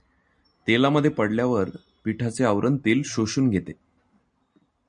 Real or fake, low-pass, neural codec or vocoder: real; 9.9 kHz; none